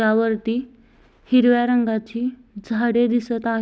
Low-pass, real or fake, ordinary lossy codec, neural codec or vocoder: none; real; none; none